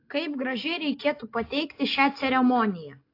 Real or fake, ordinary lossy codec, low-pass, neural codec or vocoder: real; AAC, 32 kbps; 5.4 kHz; none